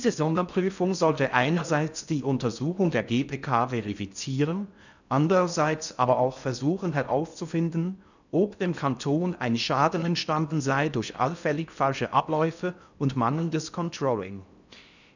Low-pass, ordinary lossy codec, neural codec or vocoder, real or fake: 7.2 kHz; none; codec, 16 kHz in and 24 kHz out, 0.6 kbps, FocalCodec, streaming, 4096 codes; fake